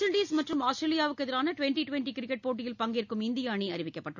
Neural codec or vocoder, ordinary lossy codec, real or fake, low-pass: none; none; real; 7.2 kHz